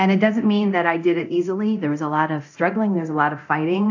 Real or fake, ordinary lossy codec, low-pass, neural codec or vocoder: fake; AAC, 48 kbps; 7.2 kHz; codec, 24 kHz, 0.9 kbps, DualCodec